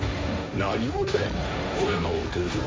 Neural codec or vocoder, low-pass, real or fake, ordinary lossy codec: codec, 16 kHz, 1.1 kbps, Voila-Tokenizer; none; fake; none